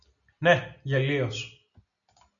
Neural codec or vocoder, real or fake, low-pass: none; real; 7.2 kHz